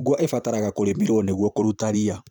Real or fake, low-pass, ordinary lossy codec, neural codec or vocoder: fake; none; none; vocoder, 44.1 kHz, 128 mel bands every 512 samples, BigVGAN v2